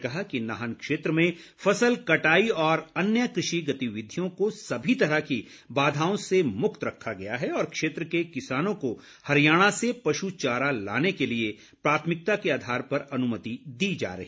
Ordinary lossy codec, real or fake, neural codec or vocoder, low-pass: none; real; none; none